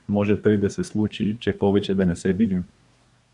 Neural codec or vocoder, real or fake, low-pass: codec, 24 kHz, 1 kbps, SNAC; fake; 10.8 kHz